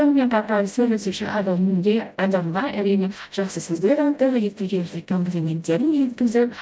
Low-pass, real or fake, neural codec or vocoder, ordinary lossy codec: none; fake; codec, 16 kHz, 0.5 kbps, FreqCodec, smaller model; none